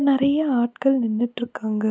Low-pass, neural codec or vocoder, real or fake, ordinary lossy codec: none; none; real; none